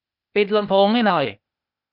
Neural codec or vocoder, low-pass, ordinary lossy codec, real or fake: codec, 16 kHz, 0.8 kbps, ZipCodec; 5.4 kHz; none; fake